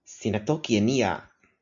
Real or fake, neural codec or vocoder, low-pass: real; none; 7.2 kHz